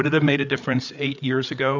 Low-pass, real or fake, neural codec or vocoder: 7.2 kHz; fake; codec, 16 kHz, 8 kbps, FreqCodec, larger model